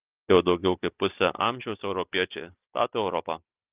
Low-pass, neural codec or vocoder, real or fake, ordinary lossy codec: 3.6 kHz; none; real; Opus, 24 kbps